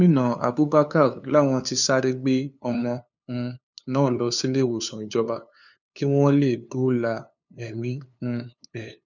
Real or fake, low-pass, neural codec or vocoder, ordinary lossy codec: fake; 7.2 kHz; codec, 16 kHz, 2 kbps, FunCodec, trained on LibriTTS, 25 frames a second; none